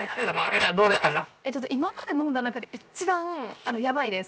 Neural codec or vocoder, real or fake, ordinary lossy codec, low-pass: codec, 16 kHz, 0.7 kbps, FocalCodec; fake; none; none